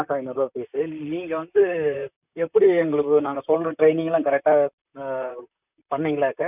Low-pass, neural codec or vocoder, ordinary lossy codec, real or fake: 3.6 kHz; vocoder, 44.1 kHz, 128 mel bands, Pupu-Vocoder; none; fake